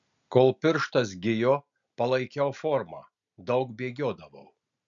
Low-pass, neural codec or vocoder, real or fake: 7.2 kHz; none; real